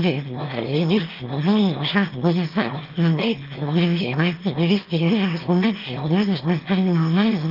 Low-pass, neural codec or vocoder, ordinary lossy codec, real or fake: 5.4 kHz; autoencoder, 22.05 kHz, a latent of 192 numbers a frame, VITS, trained on one speaker; Opus, 32 kbps; fake